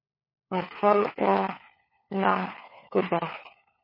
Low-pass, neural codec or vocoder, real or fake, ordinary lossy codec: 5.4 kHz; codec, 16 kHz, 4 kbps, FunCodec, trained on LibriTTS, 50 frames a second; fake; MP3, 24 kbps